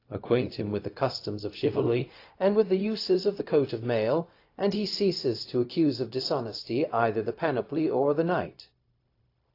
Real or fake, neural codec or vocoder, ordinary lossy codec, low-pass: fake; codec, 16 kHz, 0.4 kbps, LongCat-Audio-Codec; AAC, 32 kbps; 5.4 kHz